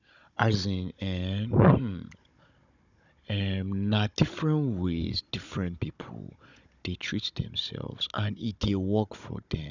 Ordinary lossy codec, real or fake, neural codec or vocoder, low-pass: none; fake; codec, 16 kHz, 16 kbps, FunCodec, trained on Chinese and English, 50 frames a second; 7.2 kHz